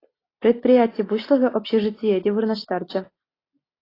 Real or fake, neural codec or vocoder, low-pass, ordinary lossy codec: real; none; 5.4 kHz; AAC, 24 kbps